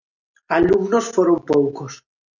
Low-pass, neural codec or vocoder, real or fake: 7.2 kHz; none; real